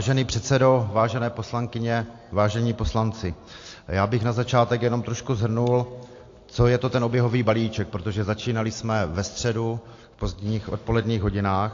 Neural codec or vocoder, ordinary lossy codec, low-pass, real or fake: none; AAC, 48 kbps; 7.2 kHz; real